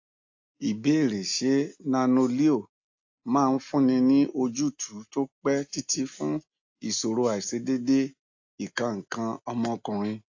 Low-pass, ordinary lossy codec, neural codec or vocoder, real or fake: 7.2 kHz; AAC, 48 kbps; none; real